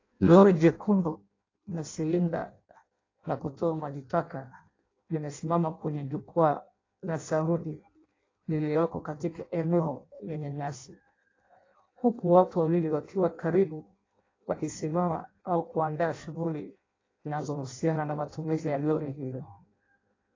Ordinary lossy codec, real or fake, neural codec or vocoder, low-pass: AAC, 32 kbps; fake; codec, 16 kHz in and 24 kHz out, 0.6 kbps, FireRedTTS-2 codec; 7.2 kHz